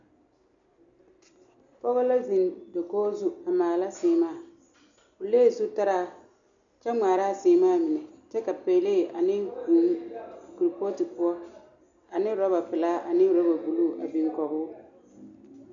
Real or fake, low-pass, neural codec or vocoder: real; 7.2 kHz; none